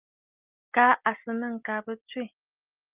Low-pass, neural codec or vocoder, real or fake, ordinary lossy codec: 3.6 kHz; none; real; Opus, 24 kbps